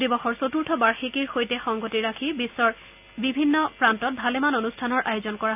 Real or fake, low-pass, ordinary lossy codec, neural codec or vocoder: real; 3.6 kHz; none; none